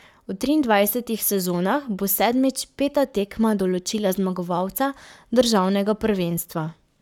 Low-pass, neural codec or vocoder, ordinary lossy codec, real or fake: 19.8 kHz; codec, 44.1 kHz, 7.8 kbps, Pupu-Codec; none; fake